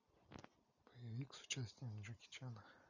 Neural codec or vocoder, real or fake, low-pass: none; real; 7.2 kHz